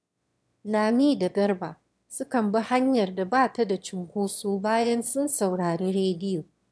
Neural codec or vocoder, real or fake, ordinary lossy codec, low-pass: autoencoder, 22.05 kHz, a latent of 192 numbers a frame, VITS, trained on one speaker; fake; none; none